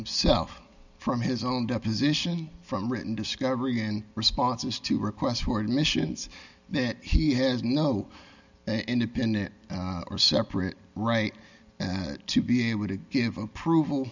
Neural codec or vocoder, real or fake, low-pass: none; real; 7.2 kHz